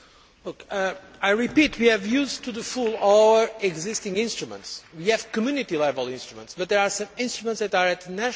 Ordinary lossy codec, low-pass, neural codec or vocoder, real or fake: none; none; none; real